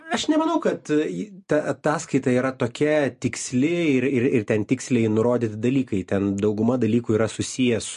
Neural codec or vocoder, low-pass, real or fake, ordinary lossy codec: none; 14.4 kHz; real; MP3, 48 kbps